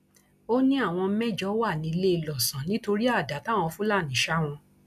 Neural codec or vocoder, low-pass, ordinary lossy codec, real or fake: none; 14.4 kHz; none; real